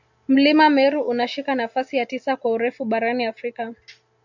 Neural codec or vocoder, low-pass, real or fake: none; 7.2 kHz; real